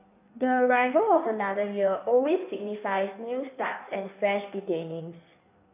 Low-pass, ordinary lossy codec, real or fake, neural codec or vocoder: 3.6 kHz; none; fake; codec, 16 kHz in and 24 kHz out, 1.1 kbps, FireRedTTS-2 codec